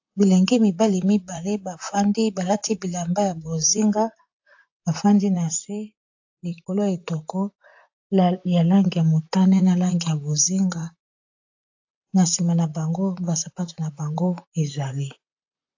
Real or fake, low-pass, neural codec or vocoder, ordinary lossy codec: fake; 7.2 kHz; vocoder, 44.1 kHz, 128 mel bands, Pupu-Vocoder; AAC, 48 kbps